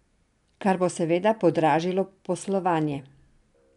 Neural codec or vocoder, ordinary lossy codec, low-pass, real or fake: none; none; 10.8 kHz; real